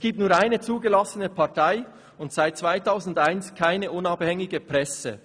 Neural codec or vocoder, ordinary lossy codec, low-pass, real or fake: none; none; 9.9 kHz; real